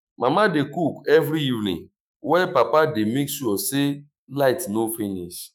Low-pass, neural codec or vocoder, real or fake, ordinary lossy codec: 19.8 kHz; autoencoder, 48 kHz, 128 numbers a frame, DAC-VAE, trained on Japanese speech; fake; none